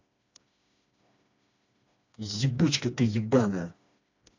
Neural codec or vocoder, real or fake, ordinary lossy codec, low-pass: codec, 16 kHz, 2 kbps, FreqCodec, smaller model; fake; AAC, 48 kbps; 7.2 kHz